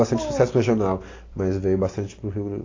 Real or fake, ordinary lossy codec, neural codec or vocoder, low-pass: real; AAC, 32 kbps; none; 7.2 kHz